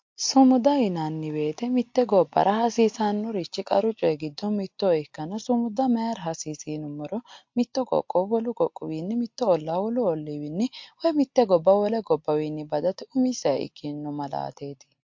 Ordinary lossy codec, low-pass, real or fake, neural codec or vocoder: MP3, 48 kbps; 7.2 kHz; real; none